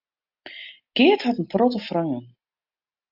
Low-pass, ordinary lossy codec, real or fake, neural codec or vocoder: 5.4 kHz; AAC, 48 kbps; real; none